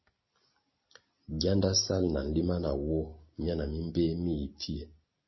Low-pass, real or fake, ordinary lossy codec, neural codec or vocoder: 7.2 kHz; real; MP3, 24 kbps; none